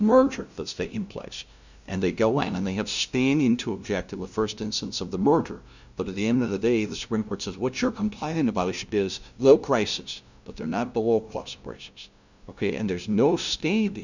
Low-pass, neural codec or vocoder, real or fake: 7.2 kHz; codec, 16 kHz, 0.5 kbps, FunCodec, trained on LibriTTS, 25 frames a second; fake